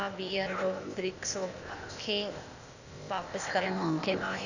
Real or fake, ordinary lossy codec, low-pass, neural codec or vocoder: fake; none; 7.2 kHz; codec, 16 kHz, 0.8 kbps, ZipCodec